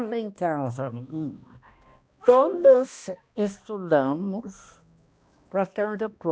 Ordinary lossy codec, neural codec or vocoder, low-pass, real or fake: none; codec, 16 kHz, 1 kbps, X-Codec, HuBERT features, trained on balanced general audio; none; fake